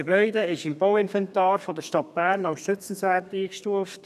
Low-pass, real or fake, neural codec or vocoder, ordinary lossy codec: 14.4 kHz; fake; codec, 32 kHz, 1.9 kbps, SNAC; none